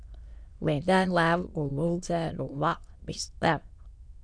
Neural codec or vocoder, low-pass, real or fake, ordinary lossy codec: autoencoder, 22.05 kHz, a latent of 192 numbers a frame, VITS, trained on many speakers; 9.9 kHz; fake; Opus, 64 kbps